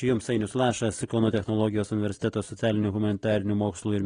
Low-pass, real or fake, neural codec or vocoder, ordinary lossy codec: 9.9 kHz; real; none; AAC, 32 kbps